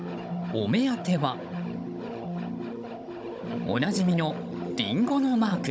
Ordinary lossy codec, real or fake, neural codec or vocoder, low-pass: none; fake; codec, 16 kHz, 16 kbps, FunCodec, trained on Chinese and English, 50 frames a second; none